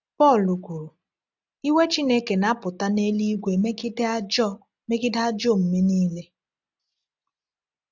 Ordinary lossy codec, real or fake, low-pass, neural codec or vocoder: none; real; 7.2 kHz; none